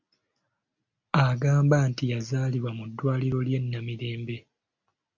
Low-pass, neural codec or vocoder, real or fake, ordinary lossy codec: 7.2 kHz; none; real; MP3, 48 kbps